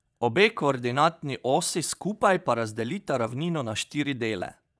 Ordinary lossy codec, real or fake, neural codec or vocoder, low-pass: none; fake; vocoder, 22.05 kHz, 80 mel bands, Vocos; none